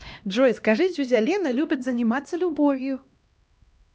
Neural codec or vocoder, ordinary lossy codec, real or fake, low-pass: codec, 16 kHz, 1 kbps, X-Codec, HuBERT features, trained on LibriSpeech; none; fake; none